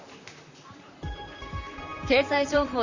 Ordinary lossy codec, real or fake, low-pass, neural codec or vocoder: Opus, 64 kbps; fake; 7.2 kHz; vocoder, 44.1 kHz, 128 mel bands, Pupu-Vocoder